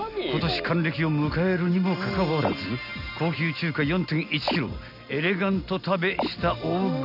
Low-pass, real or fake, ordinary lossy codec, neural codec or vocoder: 5.4 kHz; real; none; none